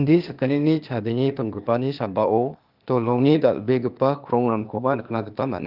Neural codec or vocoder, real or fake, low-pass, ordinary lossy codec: codec, 16 kHz, 0.8 kbps, ZipCodec; fake; 5.4 kHz; Opus, 24 kbps